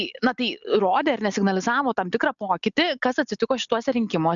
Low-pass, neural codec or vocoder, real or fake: 7.2 kHz; none; real